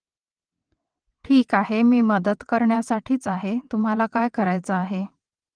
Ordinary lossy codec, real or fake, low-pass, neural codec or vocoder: Opus, 24 kbps; fake; 9.9 kHz; vocoder, 22.05 kHz, 80 mel bands, WaveNeXt